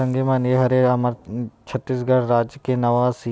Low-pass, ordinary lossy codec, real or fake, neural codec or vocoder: none; none; real; none